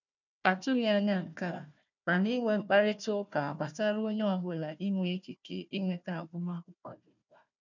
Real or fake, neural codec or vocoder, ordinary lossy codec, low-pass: fake; codec, 16 kHz, 1 kbps, FunCodec, trained on Chinese and English, 50 frames a second; none; 7.2 kHz